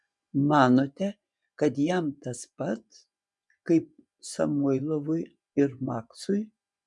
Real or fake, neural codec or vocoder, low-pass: fake; vocoder, 24 kHz, 100 mel bands, Vocos; 10.8 kHz